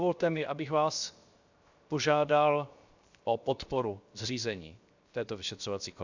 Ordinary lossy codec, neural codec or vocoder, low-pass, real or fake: Opus, 64 kbps; codec, 16 kHz, 0.7 kbps, FocalCodec; 7.2 kHz; fake